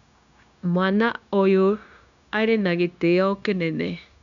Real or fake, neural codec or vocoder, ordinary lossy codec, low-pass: fake; codec, 16 kHz, 0.9 kbps, LongCat-Audio-Codec; none; 7.2 kHz